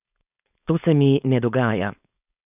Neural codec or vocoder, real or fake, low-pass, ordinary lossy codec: codec, 16 kHz, 4.8 kbps, FACodec; fake; 3.6 kHz; none